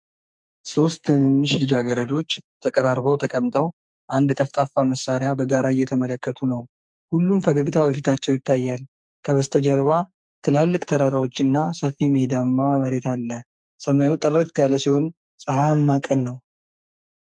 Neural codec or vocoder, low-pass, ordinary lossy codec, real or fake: codec, 44.1 kHz, 2.6 kbps, SNAC; 9.9 kHz; MP3, 64 kbps; fake